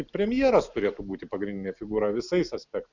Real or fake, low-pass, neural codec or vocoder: real; 7.2 kHz; none